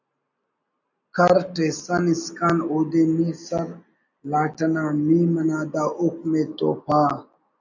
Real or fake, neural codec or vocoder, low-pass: real; none; 7.2 kHz